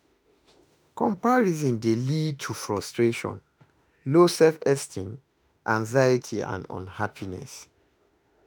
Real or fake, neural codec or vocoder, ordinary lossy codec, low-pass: fake; autoencoder, 48 kHz, 32 numbers a frame, DAC-VAE, trained on Japanese speech; none; none